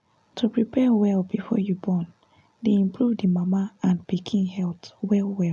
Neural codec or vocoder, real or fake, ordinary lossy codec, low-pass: none; real; none; none